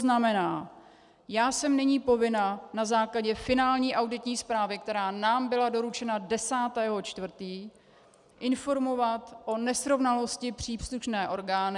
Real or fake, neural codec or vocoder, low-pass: real; none; 10.8 kHz